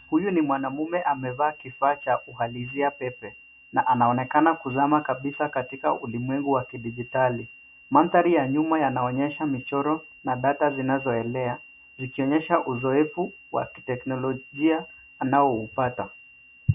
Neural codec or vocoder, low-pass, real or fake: none; 3.6 kHz; real